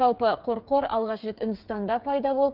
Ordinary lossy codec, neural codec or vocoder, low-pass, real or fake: Opus, 24 kbps; codec, 16 kHz, 8 kbps, FreqCodec, smaller model; 5.4 kHz; fake